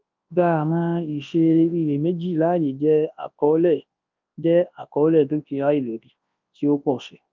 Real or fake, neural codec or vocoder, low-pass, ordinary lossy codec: fake; codec, 24 kHz, 0.9 kbps, WavTokenizer, large speech release; 7.2 kHz; Opus, 24 kbps